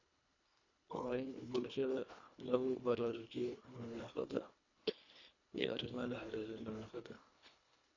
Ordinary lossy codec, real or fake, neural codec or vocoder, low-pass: none; fake; codec, 24 kHz, 1.5 kbps, HILCodec; 7.2 kHz